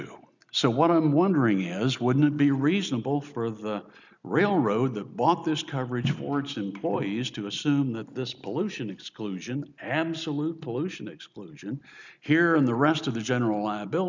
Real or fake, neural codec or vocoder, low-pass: real; none; 7.2 kHz